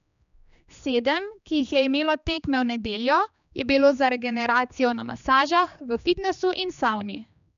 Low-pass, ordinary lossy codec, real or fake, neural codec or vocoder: 7.2 kHz; none; fake; codec, 16 kHz, 2 kbps, X-Codec, HuBERT features, trained on general audio